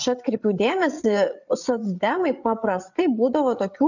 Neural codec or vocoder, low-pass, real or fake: codec, 16 kHz, 8 kbps, FreqCodec, larger model; 7.2 kHz; fake